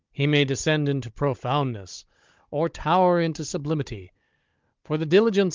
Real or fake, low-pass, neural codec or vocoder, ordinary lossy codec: fake; 7.2 kHz; codec, 16 kHz, 16 kbps, FunCodec, trained on Chinese and English, 50 frames a second; Opus, 32 kbps